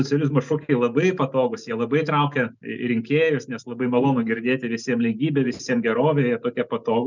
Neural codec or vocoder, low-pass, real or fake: none; 7.2 kHz; real